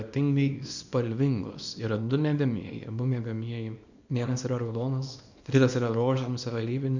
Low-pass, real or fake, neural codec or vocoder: 7.2 kHz; fake; codec, 24 kHz, 0.9 kbps, WavTokenizer, small release